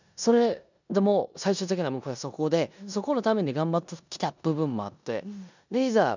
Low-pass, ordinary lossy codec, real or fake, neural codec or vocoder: 7.2 kHz; none; fake; codec, 16 kHz in and 24 kHz out, 0.9 kbps, LongCat-Audio-Codec, four codebook decoder